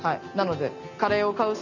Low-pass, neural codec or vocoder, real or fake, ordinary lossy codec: 7.2 kHz; none; real; none